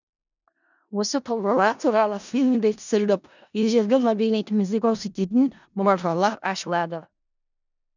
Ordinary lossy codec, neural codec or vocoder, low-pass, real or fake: none; codec, 16 kHz in and 24 kHz out, 0.4 kbps, LongCat-Audio-Codec, four codebook decoder; 7.2 kHz; fake